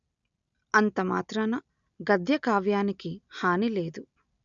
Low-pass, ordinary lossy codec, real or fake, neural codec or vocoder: 7.2 kHz; none; real; none